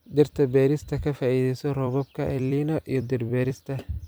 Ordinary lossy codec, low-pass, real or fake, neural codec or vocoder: none; none; fake; vocoder, 44.1 kHz, 128 mel bands every 256 samples, BigVGAN v2